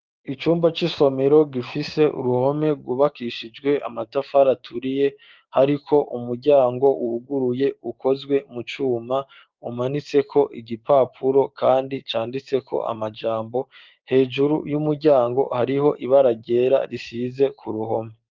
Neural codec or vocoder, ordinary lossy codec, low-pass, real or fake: codec, 16 kHz, 6 kbps, DAC; Opus, 24 kbps; 7.2 kHz; fake